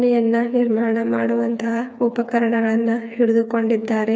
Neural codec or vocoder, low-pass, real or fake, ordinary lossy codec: codec, 16 kHz, 4 kbps, FreqCodec, smaller model; none; fake; none